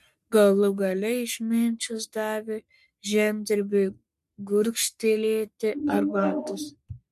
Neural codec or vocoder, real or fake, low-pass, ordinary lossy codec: codec, 44.1 kHz, 3.4 kbps, Pupu-Codec; fake; 14.4 kHz; MP3, 64 kbps